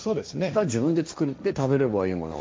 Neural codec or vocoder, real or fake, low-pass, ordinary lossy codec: codec, 16 kHz, 1.1 kbps, Voila-Tokenizer; fake; none; none